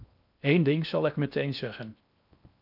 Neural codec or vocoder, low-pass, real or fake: codec, 16 kHz in and 24 kHz out, 0.8 kbps, FocalCodec, streaming, 65536 codes; 5.4 kHz; fake